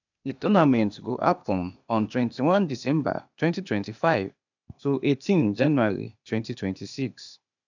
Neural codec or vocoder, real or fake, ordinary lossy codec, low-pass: codec, 16 kHz, 0.8 kbps, ZipCodec; fake; none; 7.2 kHz